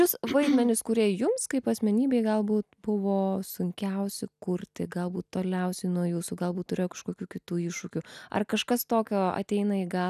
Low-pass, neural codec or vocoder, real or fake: 14.4 kHz; none; real